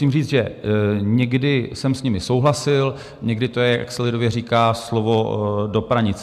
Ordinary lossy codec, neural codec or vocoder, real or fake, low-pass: MP3, 96 kbps; none; real; 14.4 kHz